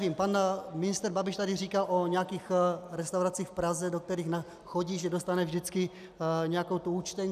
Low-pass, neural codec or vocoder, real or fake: 14.4 kHz; none; real